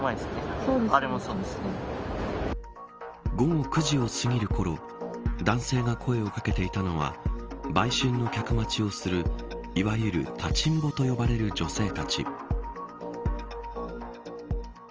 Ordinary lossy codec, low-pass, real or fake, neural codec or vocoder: Opus, 24 kbps; 7.2 kHz; real; none